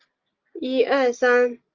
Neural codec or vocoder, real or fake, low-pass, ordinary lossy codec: none; real; 7.2 kHz; Opus, 32 kbps